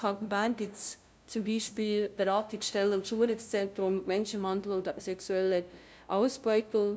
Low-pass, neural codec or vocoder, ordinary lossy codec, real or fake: none; codec, 16 kHz, 0.5 kbps, FunCodec, trained on LibriTTS, 25 frames a second; none; fake